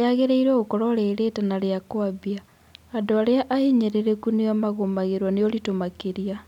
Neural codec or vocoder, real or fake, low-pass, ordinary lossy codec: none; real; 19.8 kHz; none